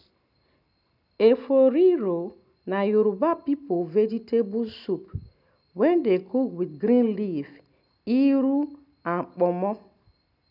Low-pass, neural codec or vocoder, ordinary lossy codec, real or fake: 5.4 kHz; none; none; real